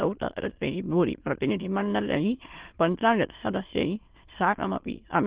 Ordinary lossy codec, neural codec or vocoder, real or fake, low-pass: Opus, 16 kbps; autoencoder, 22.05 kHz, a latent of 192 numbers a frame, VITS, trained on many speakers; fake; 3.6 kHz